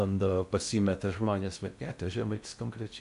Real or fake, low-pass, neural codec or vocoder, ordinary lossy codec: fake; 10.8 kHz; codec, 16 kHz in and 24 kHz out, 0.6 kbps, FocalCodec, streaming, 2048 codes; MP3, 64 kbps